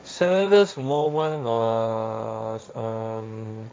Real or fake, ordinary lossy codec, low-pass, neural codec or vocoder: fake; none; none; codec, 16 kHz, 1.1 kbps, Voila-Tokenizer